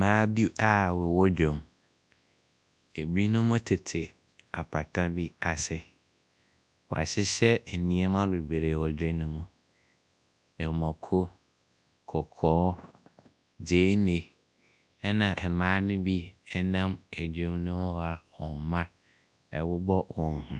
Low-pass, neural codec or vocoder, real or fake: 10.8 kHz; codec, 24 kHz, 0.9 kbps, WavTokenizer, large speech release; fake